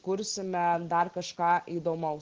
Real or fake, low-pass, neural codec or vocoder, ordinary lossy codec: real; 7.2 kHz; none; Opus, 16 kbps